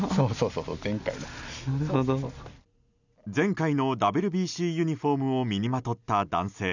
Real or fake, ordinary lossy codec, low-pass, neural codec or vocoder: real; none; 7.2 kHz; none